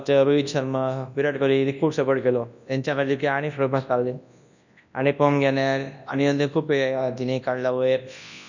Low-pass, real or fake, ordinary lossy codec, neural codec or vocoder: 7.2 kHz; fake; none; codec, 24 kHz, 0.9 kbps, WavTokenizer, large speech release